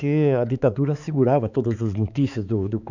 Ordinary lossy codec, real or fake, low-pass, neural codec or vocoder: none; fake; 7.2 kHz; codec, 16 kHz, 4 kbps, X-Codec, HuBERT features, trained on balanced general audio